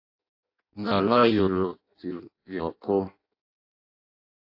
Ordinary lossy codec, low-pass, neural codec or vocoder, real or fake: AAC, 32 kbps; 5.4 kHz; codec, 16 kHz in and 24 kHz out, 0.6 kbps, FireRedTTS-2 codec; fake